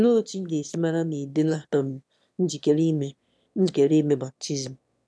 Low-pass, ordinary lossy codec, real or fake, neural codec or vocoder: none; none; fake; autoencoder, 22.05 kHz, a latent of 192 numbers a frame, VITS, trained on one speaker